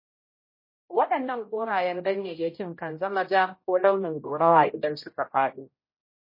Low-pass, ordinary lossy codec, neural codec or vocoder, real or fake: 5.4 kHz; MP3, 24 kbps; codec, 16 kHz, 0.5 kbps, X-Codec, HuBERT features, trained on general audio; fake